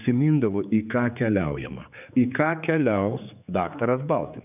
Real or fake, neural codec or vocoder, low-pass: fake; codec, 16 kHz, 4 kbps, X-Codec, HuBERT features, trained on general audio; 3.6 kHz